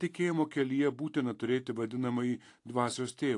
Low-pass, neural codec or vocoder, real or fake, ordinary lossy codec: 10.8 kHz; none; real; AAC, 48 kbps